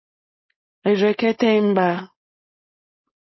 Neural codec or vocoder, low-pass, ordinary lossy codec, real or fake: codec, 16 kHz, 4.8 kbps, FACodec; 7.2 kHz; MP3, 24 kbps; fake